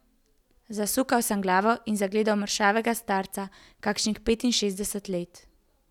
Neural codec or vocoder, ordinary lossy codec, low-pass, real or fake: none; none; 19.8 kHz; real